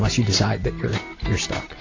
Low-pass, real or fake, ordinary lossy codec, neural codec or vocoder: 7.2 kHz; real; AAC, 32 kbps; none